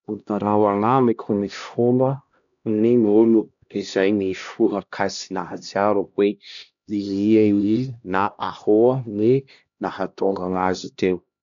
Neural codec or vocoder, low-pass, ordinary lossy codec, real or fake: codec, 16 kHz, 1 kbps, X-Codec, HuBERT features, trained on LibriSpeech; 7.2 kHz; none; fake